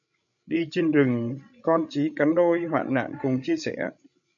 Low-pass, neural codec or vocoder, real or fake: 7.2 kHz; codec, 16 kHz, 16 kbps, FreqCodec, larger model; fake